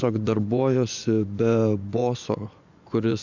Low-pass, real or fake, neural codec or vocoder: 7.2 kHz; fake; vocoder, 24 kHz, 100 mel bands, Vocos